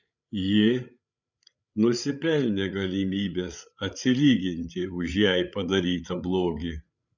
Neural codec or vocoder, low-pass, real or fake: codec, 16 kHz, 8 kbps, FreqCodec, larger model; 7.2 kHz; fake